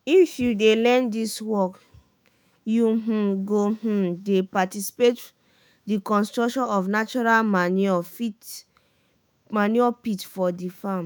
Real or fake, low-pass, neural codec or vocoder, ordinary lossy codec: fake; none; autoencoder, 48 kHz, 128 numbers a frame, DAC-VAE, trained on Japanese speech; none